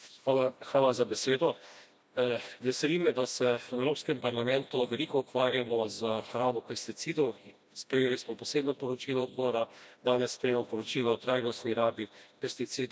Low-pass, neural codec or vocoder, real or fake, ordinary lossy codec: none; codec, 16 kHz, 1 kbps, FreqCodec, smaller model; fake; none